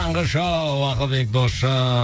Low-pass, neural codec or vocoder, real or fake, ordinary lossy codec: none; codec, 16 kHz, 8 kbps, FreqCodec, smaller model; fake; none